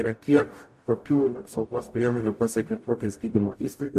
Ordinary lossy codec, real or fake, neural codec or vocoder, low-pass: MP3, 64 kbps; fake; codec, 44.1 kHz, 0.9 kbps, DAC; 14.4 kHz